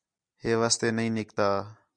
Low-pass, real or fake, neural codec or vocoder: 9.9 kHz; real; none